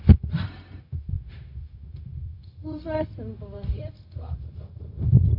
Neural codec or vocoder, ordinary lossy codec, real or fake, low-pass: codec, 16 kHz, 0.4 kbps, LongCat-Audio-Codec; MP3, 48 kbps; fake; 5.4 kHz